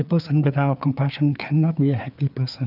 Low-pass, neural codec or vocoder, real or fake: 5.4 kHz; codec, 16 kHz, 4 kbps, FreqCodec, larger model; fake